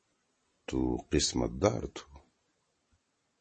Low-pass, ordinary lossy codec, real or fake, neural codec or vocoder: 10.8 kHz; MP3, 32 kbps; fake; vocoder, 44.1 kHz, 128 mel bands every 256 samples, BigVGAN v2